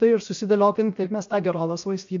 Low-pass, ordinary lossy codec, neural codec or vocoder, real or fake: 7.2 kHz; MP3, 48 kbps; codec, 16 kHz, 0.7 kbps, FocalCodec; fake